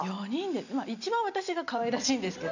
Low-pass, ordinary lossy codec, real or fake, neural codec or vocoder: 7.2 kHz; none; real; none